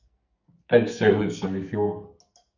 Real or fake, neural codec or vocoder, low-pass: fake; codec, 44.1 kHz, 2.6 kbps, SNAC; 7.2 kHz